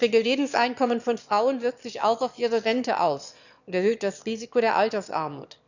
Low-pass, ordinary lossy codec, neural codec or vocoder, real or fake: 7.2 kHz; none; autoencoder, 22.05 kHz, a latent of 192 numbers a frame, VITS, trained on one speaker; fake